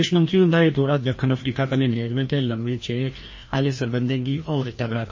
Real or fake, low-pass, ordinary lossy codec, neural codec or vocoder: fake; 7.2 kHz; MP3, 32 kbps; codec, 16 kHz, 1 kbps, FreqCodec, larger model